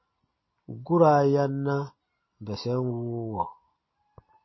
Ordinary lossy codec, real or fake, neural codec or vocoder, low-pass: MP3, 24 kbps; real; none; 7.2 kHz